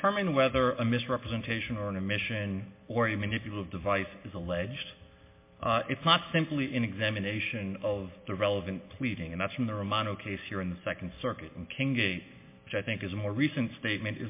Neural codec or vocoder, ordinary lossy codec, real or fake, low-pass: none; MP3, 24 kbps; real; 3.6 kHz